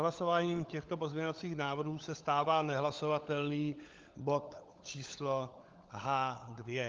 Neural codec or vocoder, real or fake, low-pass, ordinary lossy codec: codec, 16 kHz, 16 kbps, FunCodec, trained on LibriTTS, 50 frames a second; fake; 7.2 kHz; Opus, 32 kbps